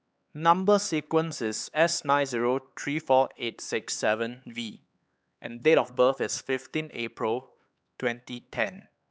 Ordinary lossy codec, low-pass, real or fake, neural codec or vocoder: none; none; fake; codec, 16 kHz, 4 kbps, X-Codec, HuBERT features, trained on LibriSpeech